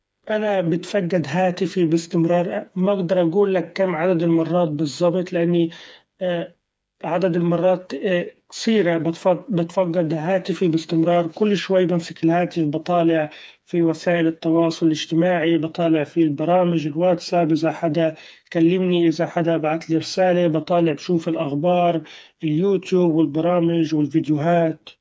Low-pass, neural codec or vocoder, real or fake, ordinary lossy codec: none; codec, 16 kHz, 4 kbps, FreqCodec, smaller model; fake; none